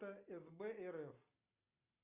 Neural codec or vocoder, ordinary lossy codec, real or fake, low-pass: none; Opus, 64 kbps; real; 3.6 kHz